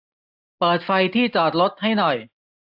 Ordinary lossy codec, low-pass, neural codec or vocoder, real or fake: AAC, 32 kbps; 5.4 kHz; none; real